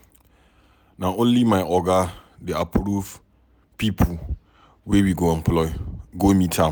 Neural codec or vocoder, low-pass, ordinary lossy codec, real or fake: none; none; none; real